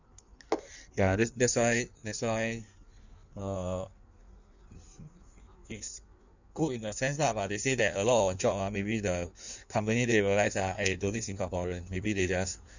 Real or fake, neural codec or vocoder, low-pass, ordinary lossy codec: fake; codec, 16 kHz in and 24 kHz out, 1.1 kbps, FireRedTTS-2 codec; 7.2 kHz; none